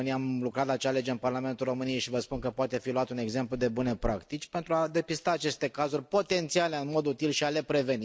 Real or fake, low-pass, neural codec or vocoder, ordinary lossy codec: real; none; none; none